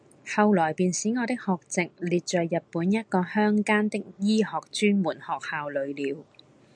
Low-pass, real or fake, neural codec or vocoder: 9.9 kHz; real; none